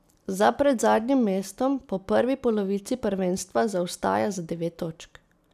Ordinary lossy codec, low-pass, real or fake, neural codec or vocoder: none; 14.4 kHz; real; none